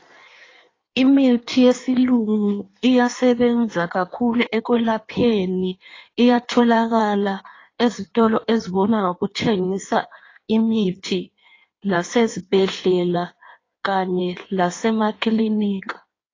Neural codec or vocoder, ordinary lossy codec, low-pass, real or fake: codec, 16 kHz in and 24 kHz out, 1.1 kbps, FireRedTTS-2 codec; AAC, 32 kbps; 7.2 kHz; fake